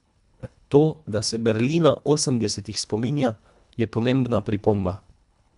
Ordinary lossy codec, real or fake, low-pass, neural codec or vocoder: none; fake; 10.8 kHz; codec, 24 kHz, 1.5 kbps, HILCodec